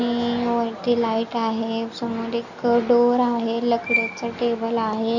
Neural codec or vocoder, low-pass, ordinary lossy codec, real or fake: none; 7.2 kHz; none; real